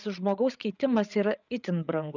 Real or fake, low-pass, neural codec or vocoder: fake; 7.2 kHz; codec, 16 kHz, 8 kbps, FreqCodec, larger model